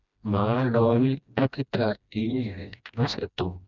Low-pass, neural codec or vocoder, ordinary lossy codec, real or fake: 7.2 kHz; codec, 16 kHz, 1 kbps, FreqCodec, smaller model; none; fake